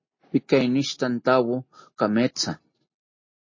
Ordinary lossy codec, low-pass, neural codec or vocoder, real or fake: MP3, 32 kbps; 7.2 kHz; none; real